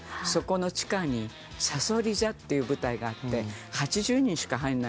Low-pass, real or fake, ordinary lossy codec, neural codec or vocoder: none; real; none; none